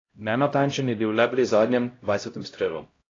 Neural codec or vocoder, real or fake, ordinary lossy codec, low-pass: codec, 16 kHz, 0.5 kbps, X-Codec, HuBERT features, trained on LibriSpeech; fake; AAC, 32 kbps; 7.2 kHz